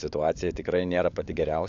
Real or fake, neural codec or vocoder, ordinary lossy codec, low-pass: fake; codec, 16 kHz, 16 kbps, FunCodec, trained on LibriTTS, 50 frames a second; AAC, 64 kbps; 7.2 kHz